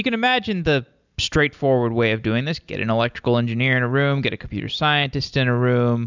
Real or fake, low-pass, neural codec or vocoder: real; 7.2 kHz; none